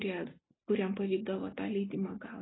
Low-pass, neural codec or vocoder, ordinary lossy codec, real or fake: 7.2 kHz; none; AAC, 16 kbps; real